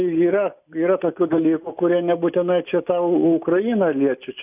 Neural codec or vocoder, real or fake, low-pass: none; real; 3.6 kHz